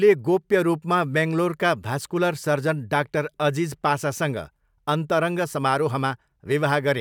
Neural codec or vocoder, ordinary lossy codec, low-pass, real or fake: none; none; 19.8 kHz; real